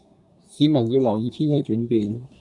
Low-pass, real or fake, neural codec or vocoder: 10.8 kHz; fake; codec, 24 kHz, 1 kbps, SNAC